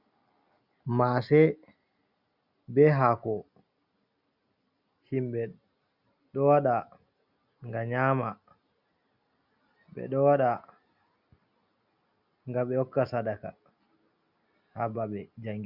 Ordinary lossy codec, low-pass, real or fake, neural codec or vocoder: Opus, 64 kbps; 5.4 kHz; real; none